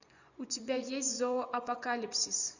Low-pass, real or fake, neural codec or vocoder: 7.2 kHz; fake; vocoder, 24 kHz, 100 mel bands, Vocos